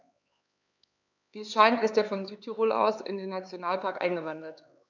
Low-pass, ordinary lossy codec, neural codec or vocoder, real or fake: 7.2 kHz; none; codec, 16 kHz, 4 kbps, X-Codec, HuBERT features, trained on LibriSpeech; fake